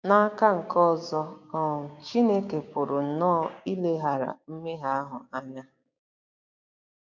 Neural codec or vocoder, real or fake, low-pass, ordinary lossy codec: codec, 16 kHz, 6 kbps, DAC; fake; 7.2 kHz; none